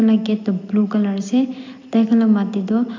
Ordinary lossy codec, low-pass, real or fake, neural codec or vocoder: AAC, 48 kbps; 7.2 kHz; real; none